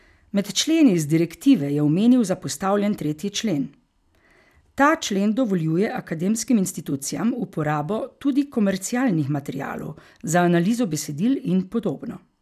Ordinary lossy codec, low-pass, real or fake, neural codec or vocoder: none; 14.4 kHz; real; none